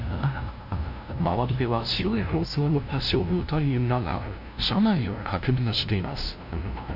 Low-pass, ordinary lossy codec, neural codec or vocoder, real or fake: 5.4 kHz; none; codec, 16 kHz, 0.5 kbps, FunCodec, trained on LibriTTS, 25 frames a second; fake